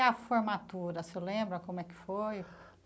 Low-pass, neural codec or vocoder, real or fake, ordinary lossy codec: none; none; real; none